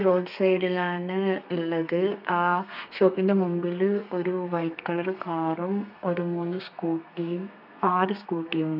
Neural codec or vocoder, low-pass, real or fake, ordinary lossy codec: codec, 32 kHz, 1.9 kbps, SNAC; 5.4 kHz; fake; none